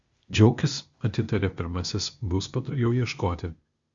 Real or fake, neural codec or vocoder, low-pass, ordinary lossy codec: fake; codec, 16 kHz, 0.8 kbps, ZipCodec; 7.2 kHz; Opus, 64 kbps